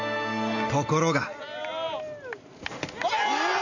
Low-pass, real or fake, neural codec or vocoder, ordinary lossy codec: 7.2 kHz; real; none; none